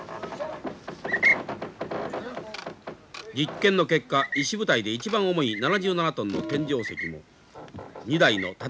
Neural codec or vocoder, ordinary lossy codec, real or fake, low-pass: none; none; real; none